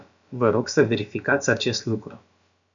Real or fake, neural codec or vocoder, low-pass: fake; codec, 16 kHz, about 1 kbps, DyCAST, with the encoder's durations; 7.2 kHz